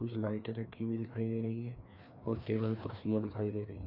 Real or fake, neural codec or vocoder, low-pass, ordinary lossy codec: fake; codec, 16 kHz, 2 kbps, FreqCodec, larger model; 5.4 kHz; AAC, 48 kbps